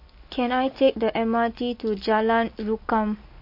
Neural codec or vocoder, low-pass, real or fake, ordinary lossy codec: none; 5.4 kHz; real; MP3, 32 kbps